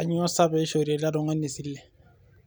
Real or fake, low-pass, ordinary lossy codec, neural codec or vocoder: real; none; none; none